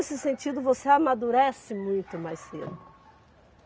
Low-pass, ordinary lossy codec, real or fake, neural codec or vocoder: none; none; real; none